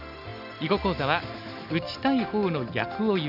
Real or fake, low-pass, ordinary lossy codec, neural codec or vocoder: real; 5.4 kHz; none; none